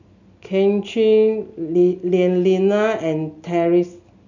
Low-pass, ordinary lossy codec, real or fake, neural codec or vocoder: 7.2 kHz; none; real; none